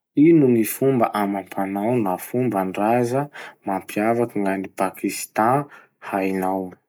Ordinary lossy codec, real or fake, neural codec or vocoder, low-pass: none; real; none; none